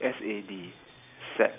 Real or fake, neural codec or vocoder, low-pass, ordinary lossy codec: fake; vocoder, 44.1 kHz, 128 mel bands every 256 samples, BigVGAN v2; 3.6 kHz; none